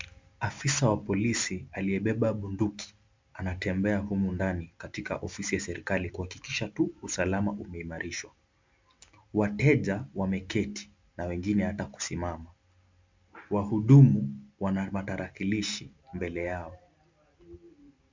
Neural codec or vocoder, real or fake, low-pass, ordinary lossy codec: none; real; 7.2 kHz; MP3, 64 kbps